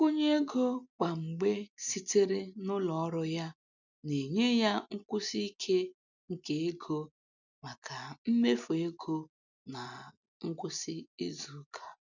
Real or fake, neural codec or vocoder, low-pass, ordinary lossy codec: real; none; 7.2 kHz; none